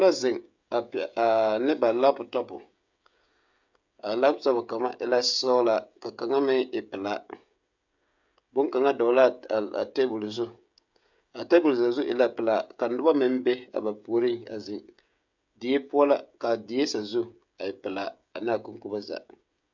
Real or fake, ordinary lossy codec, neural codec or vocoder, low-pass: fake; MP3, 64 kbps; codec, 16 kHz, 16 kbps, FreqCodec, smaller model; 7.2 kHz